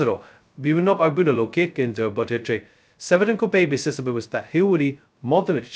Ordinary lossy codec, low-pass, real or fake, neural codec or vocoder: none; none; fake; codec, 16 kHz, 0.2 kbps, FocalCodec